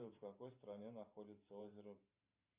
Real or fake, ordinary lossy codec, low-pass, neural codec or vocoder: fake; Opus, 64 kbps; 3.6 kHz; vocoder, 44.1 kHz, 128 mel bands every 512 samples, BigVGAN v2